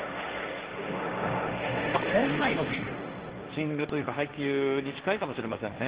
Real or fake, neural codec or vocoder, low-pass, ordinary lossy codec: fake; codec, 16 kHz, 1.1 kbps, Voila-Tokenizer; 3.6 kHz; Opus, 16 kbps